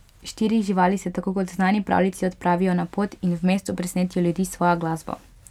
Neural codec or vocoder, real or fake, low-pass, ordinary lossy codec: none; real; 19.8 kHz; none